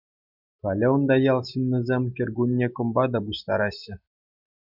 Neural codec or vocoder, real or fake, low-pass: none; real; 5.4 kHz